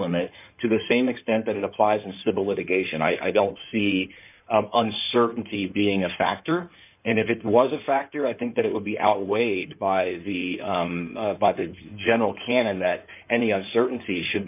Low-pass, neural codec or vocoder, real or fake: 3.6 kHz; codec, 16 kHz in and 24 kHz out, 2.2 kbps, FireRedTTS-2 codec; fake